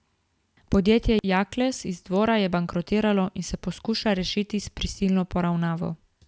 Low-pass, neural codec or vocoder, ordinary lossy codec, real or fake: none; none; none; real